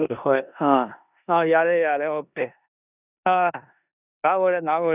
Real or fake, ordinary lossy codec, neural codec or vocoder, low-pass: fake; none; codec, 16 kHz in and 24 kHz out, 0.9 kbps, LongCat-Audio-Codec, four codebook decoder; 3.6 kHz